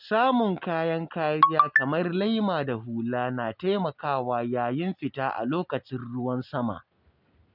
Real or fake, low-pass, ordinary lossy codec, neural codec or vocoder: real; 5.4 kHz; none; none